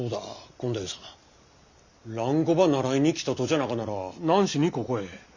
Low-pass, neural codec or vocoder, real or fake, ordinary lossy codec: 7.2 kHz; none; real; Opus, 64 kbps